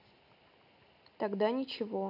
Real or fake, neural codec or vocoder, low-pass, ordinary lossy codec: real; none; 5.4 kHz; none